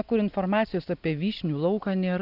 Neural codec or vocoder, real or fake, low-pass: none; real; 5.4 kHz